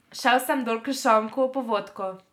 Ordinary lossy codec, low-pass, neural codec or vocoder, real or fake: none; 19.8 kHz; none; real